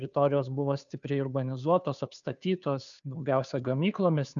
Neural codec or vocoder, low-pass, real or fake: codec, 16 kHz, 2 kbps, FunCodec, trained on Chinese and English, 25 frames a second; 7.2 kHz; fake